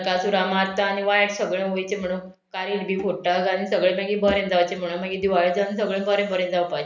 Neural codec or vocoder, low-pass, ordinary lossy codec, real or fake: none; 7.2 kHz; none; real